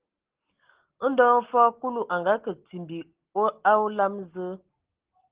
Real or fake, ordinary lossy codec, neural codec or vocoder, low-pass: real; Opus, 16 kbps; none; 3.6 kHz